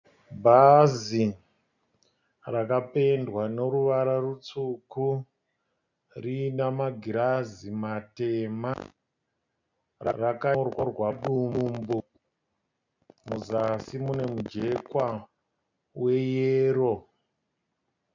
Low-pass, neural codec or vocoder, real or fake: 7.2 kHz; none; real